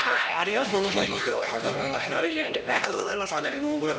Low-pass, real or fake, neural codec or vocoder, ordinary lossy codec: none; fake; codec, 16 kHz, 1 kbps, X-Codec, HuBERT features, trained on LibriSpeech; none